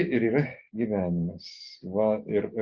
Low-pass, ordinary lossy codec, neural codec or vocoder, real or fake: 7.2 kHz; Opus, 64 kbps; none; real